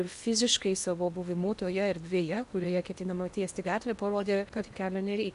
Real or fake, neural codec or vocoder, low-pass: fake; codec, 16 kHz in and 24 kHz out, 0.8 kbps, FocalCodec, streaming, 65536 codes; 10.8 kHz